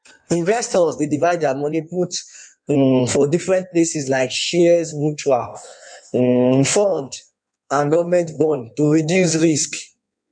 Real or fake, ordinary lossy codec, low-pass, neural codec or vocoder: fake; none; 9.9 kHz; codec, 16 kHz in and 24 kHz out, 1.1 kbps, FireRedTTS-2 codec